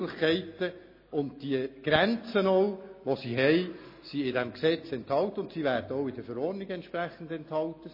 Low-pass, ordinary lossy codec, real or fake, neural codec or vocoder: 5.4 kHz; MP3, 24 kbps; real; none